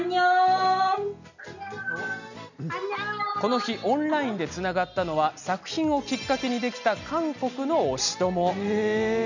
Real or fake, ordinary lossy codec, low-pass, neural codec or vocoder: real; none; 7.2 kHz; none